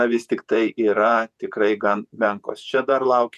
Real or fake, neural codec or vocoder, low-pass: fake; vocoder, 48 kHz, 128 mel bands, Vocos; 14.4 kHz